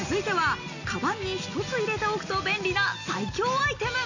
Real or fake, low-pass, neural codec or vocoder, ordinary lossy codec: real; 7.2 kHz; none; none